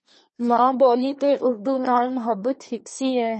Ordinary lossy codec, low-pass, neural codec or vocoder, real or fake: MP3, 32 kbps; 10.8 kHz; codec, 24 kHz, 1 kbps, SNAC; fake